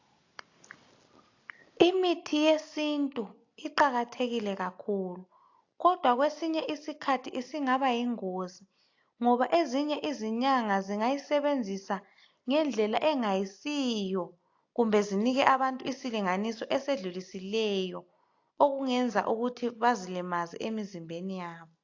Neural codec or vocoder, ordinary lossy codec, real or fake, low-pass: none; AAC, 48 kbps; real; 7.2 kHz